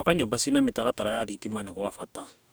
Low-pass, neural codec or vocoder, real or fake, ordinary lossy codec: none; codec, 44.1 kHz, 2.6 kbps, DAC; fake; none